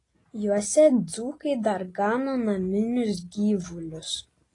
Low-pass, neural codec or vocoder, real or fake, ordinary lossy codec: 10.8 kHz; none; real; AAC, 32 kbps